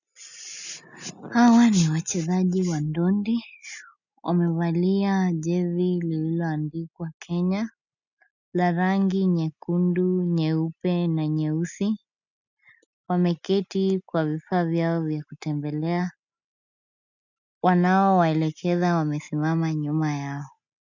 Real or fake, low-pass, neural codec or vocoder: real; 7.2 kHz; none